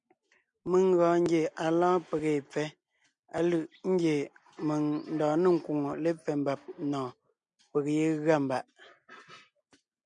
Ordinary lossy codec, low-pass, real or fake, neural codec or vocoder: MP3, 64 kbps; 9.9 kHz; real; none